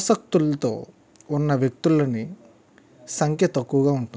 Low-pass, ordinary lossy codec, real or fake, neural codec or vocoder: none; none; real; none